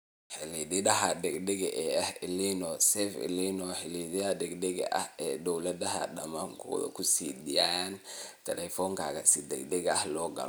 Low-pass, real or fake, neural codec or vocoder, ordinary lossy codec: none; real; none; none